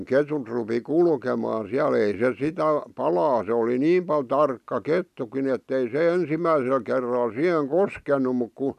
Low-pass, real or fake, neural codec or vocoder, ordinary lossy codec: 14.4 kHz; real; none; none